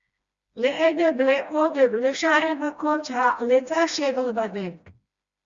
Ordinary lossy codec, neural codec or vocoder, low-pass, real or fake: Opus, 64 kbps; codec, 16 kHz, 1 kbps, FreqCodec, smaller model; 7.2 kHz; fake